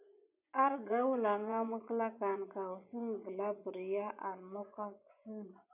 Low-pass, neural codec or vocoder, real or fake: 3.6 kHz; vocoder, 24 kHz, 100 mel bands, Vocos; fake